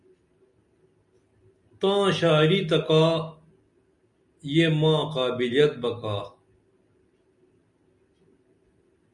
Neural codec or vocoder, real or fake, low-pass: none; real; 10.8 kHz